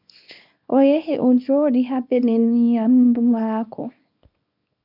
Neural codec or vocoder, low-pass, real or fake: codec, 24 kHz, 0.9 kbps, WavTokenizer, small release; 5.4 kHz; fake